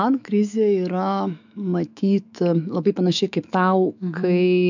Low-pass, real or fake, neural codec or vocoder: 7.2 kHz; fake; autoencoder, 48 kHz, 128 numbers a frame, DAC-VAE, trained on Japanese speech